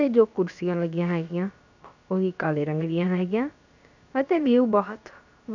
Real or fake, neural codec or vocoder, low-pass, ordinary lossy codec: fake; codec, 16 kHz, about 1 kbps, DyCAST, with the encoder's durations; 7.2 kHz; none